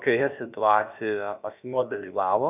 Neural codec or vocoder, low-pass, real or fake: codec, 16 kHz, about 1 kbps, DyCAST, with the encoder's durations; 3.6 kHz; fake